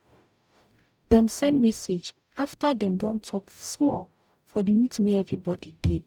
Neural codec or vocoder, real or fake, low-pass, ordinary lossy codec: codec, 44.1 kHz, 0.9 kbps, DAC; fake; 19.8 kHz; none